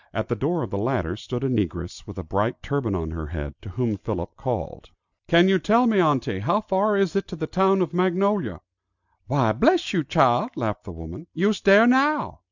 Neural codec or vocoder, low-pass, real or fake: none; 7.2 kHz; real